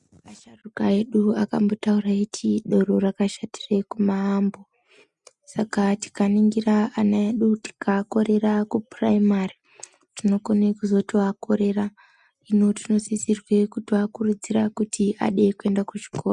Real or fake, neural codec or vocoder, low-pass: real; none; 10.8 kHz